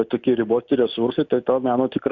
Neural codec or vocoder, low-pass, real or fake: none; 7.2 kHz; real